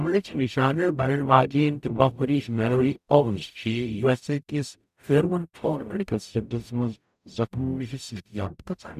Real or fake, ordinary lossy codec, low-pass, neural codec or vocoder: fake; none; 14.4 kHz; codec, 44.1 kHz, 0.9 kbps, DAC